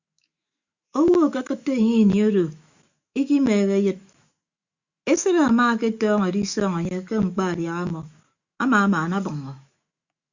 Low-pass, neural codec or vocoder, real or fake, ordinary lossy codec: 7.2 kHz; autoencoder, 48 kHz, 128 numbers a frame, DAC-VAE, trained on Japanese speech; fake; Opus, 64 kbps